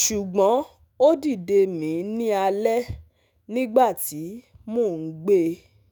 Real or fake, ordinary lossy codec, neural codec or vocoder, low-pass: fake; none; autoencoder, 48 kHz, 128 numbers a frame, DAC-VAE, trained on Japanese speech; none